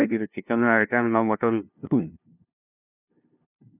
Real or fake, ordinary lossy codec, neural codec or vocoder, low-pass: fake; none; codec, 16 kHz, 0.5 kbps, FunCodec, trained on LibriTTS, 25 frames a second; 3.6 kHz